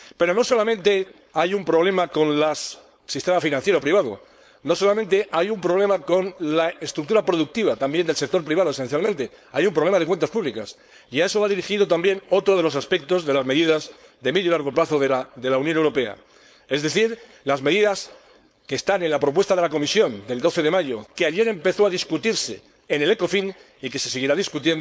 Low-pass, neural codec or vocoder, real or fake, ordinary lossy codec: none; codec, 16 kHz, 4.8 kbps, FACodec; fake; none